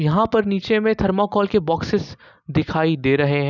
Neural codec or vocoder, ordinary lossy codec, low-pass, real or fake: none; none; 7.2 kHz; real